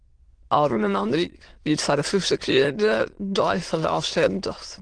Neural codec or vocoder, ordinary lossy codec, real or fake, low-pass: autoencoder, 22.05 kHz, a latent of 192 numbers a frame, VITS, trained on many speakers; Opus, 16 kbps; fake; 9.9 kHz